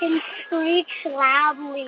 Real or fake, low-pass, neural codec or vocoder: real; 7.2 kHz; none